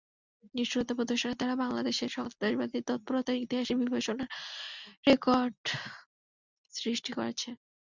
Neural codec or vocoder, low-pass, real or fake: none; 7.2 kHz; real